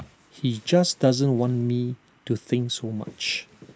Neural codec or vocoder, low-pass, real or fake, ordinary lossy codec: none; none; real; none